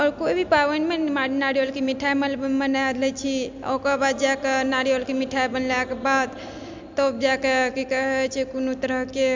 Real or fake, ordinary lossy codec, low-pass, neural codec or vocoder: real; MP3, 64 kbps; 7.2 kHz; none